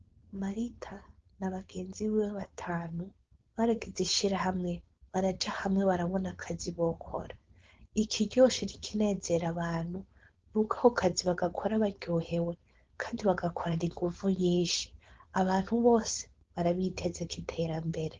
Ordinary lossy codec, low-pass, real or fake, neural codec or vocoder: Opus, 16 kbps; 7.2 kHz; fake; codec, 16 kHz, 4.8 kbps, FACodec